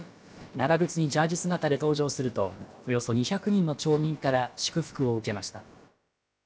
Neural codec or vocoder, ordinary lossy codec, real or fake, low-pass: codec, 16 kHz, about 1 kbps, DyCAST, with the encoder's durations; none; fake; none